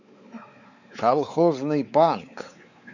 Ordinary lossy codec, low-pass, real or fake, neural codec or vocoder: none; 7.2 kHz; fake; codec, 16 kHz, 4 kbps, X-Codec, WavLM features, trained on Multilingual LibriSpeech